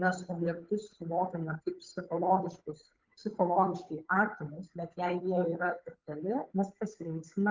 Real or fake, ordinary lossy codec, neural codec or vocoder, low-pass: fake; Opus, 24 kbps; codec, 16 kHz, 8 kbps, FunCodec, trained on Chinese and English, 25 frames a second; 7.2 kHz